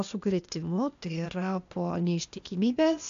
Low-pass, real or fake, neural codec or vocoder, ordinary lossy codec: 7.2 kHz; fake; codec, 16 kHz, 0.8 kbps, ZipCodec; AAC, 64 kbps